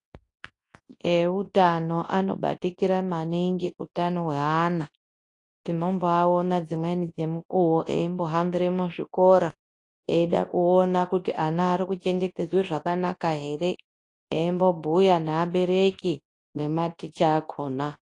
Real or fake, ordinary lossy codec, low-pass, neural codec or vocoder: fake; AAC, 48 kbps; 10.8 kHz; codec, 24 kHz, 0.9 kbps, WavTokenizer, large speech release